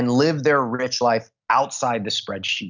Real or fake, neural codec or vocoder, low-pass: real; none; 7.2 kHz